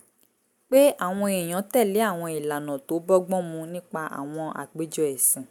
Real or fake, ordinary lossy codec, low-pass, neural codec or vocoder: real; none; none; none